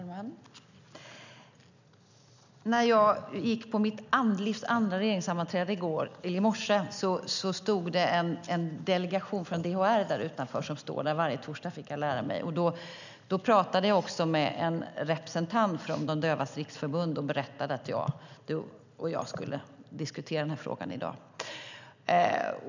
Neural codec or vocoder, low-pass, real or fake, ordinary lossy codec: none; 7.2 kHz; real; none